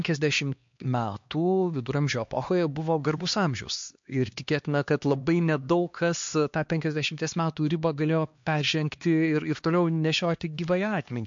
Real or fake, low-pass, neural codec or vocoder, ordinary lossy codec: fake; 7.2 kHz; codec, 16 kHz, 2 kbps, X-Codec, HuBERT features, trained on LibriSpeech; MP3, 48 kbps